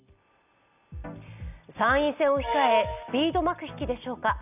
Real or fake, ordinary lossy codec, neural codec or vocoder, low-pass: real; none; none; 3.6 kHz